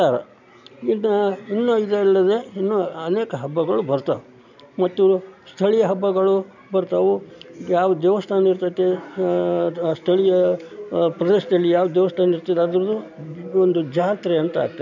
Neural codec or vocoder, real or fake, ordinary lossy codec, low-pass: none; real; none; 7.2 kHz